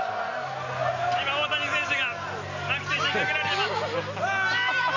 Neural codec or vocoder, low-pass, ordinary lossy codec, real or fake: none; 7.2 kHz; AAC, 32 kbps; real